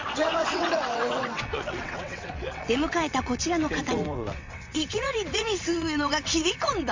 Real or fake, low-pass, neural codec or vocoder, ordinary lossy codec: fake; 7.2 kHz; vocoder, 22.05 kHz, 80 mel bands, WaveNeXt; MP3, 48 kbps